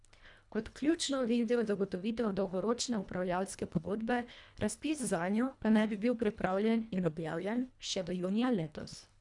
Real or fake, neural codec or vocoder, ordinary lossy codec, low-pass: fake; codec, 24 kHz, 1.5 kbps, HILCodec; none; 10.8 kHz